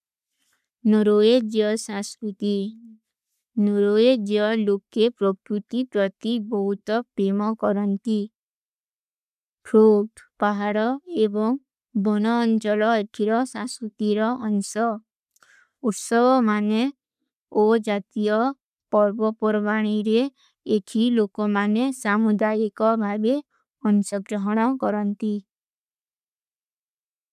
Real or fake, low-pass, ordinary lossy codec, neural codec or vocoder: fake; 14.4 kHz; none; codec, 44.1 kHz, 7.8 kbps, Pupu-Codec